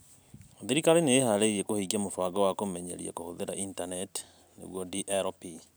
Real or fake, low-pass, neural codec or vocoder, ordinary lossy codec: real; none; none; none